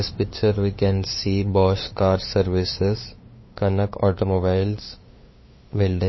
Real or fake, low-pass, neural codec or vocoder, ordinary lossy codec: fake; 7.2 kHz; codec, 16 kHz, 2 kbps, FunCodec, trained on LibriTTS, 25 frames a second; MP3, 24 kbps